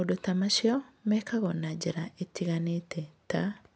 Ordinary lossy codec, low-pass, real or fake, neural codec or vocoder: none; none; real; none